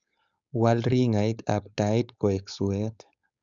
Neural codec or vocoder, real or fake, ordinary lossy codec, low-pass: codec, 16 kHz, 4.8 kbps, FACodec; fake; none; 7.2 kHz